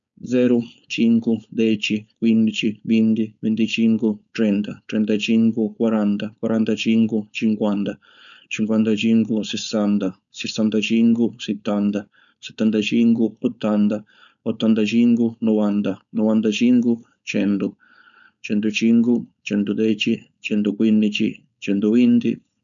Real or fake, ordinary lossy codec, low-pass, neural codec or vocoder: fake; none; 7.2 kHz; codec, 16 kHz, 4.8 kbps, FACodec